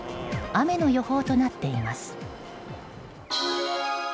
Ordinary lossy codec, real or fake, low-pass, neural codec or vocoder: none; real; none; none